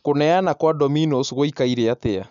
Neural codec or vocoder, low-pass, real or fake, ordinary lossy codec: none; 7.2 kHz; real; none